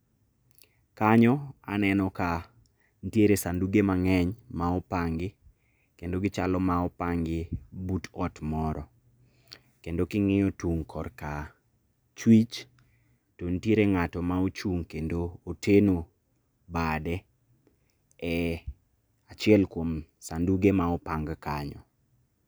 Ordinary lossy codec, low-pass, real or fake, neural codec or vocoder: none; none; real; none